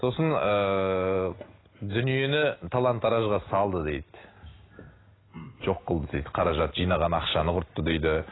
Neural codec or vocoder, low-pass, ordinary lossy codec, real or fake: none; 7.2 kHz; AAC, 16 kbps; real